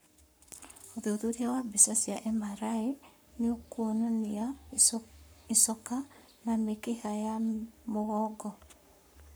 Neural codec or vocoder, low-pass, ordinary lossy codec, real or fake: codec, 44.1 kHz, 7.8 kbps, Pupu-Codec; none; none; fake